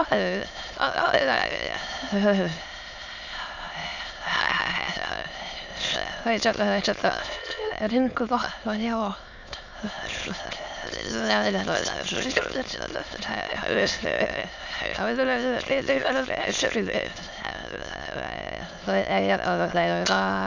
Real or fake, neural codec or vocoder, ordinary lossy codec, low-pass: fake; autoencoder, 22.05 kHz, a latent of 192 numbers a frame, VITS, trained on many speakers; none; 7.2 kHz